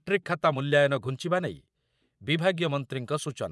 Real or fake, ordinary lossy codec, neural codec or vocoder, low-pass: fake; none; vocoder, 24 kHz, 100 mel bands, Vocos; none